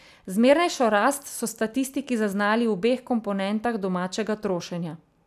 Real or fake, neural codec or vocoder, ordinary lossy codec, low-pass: real; none; none; 14.4 kHz